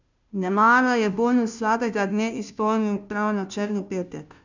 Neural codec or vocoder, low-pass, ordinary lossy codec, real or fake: codec, 16 kHz, 0.5 kbps, FunCodec, trained on Chinese and English, 25 frames a second; 7.2 kHz; none; fake